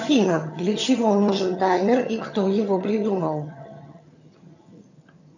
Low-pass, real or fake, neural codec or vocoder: 7.2 kHz; fake; vocoder, 22.05 kHz, 80 mel bands, HiFi-GAN